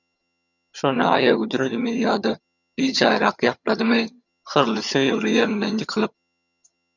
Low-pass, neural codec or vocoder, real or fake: 7.2 kHz; vocoder, 22.05 kHz, 80 mel bands, HiFi-GAN; fake